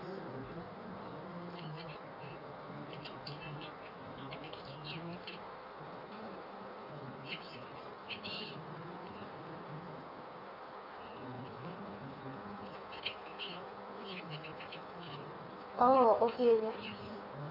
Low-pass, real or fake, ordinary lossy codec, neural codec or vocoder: 5.4 kHz; fake; none; codec, 16 kHz in and 24 kHz out, 1.1 kbps, FireRedTTS-2 codec